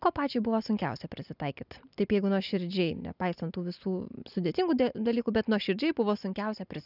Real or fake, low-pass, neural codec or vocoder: real; 5.4 kHz; none